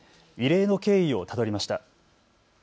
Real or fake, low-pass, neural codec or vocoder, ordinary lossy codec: real; none; none; none